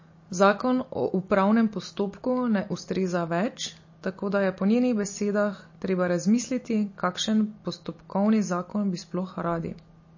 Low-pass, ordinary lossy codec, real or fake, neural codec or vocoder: 7.2 kHz; MP3, 32 kbps; real; none